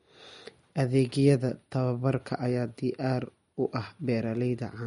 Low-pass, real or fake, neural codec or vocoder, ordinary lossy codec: 10.8 kHz; real; none; MP3, 48 kbps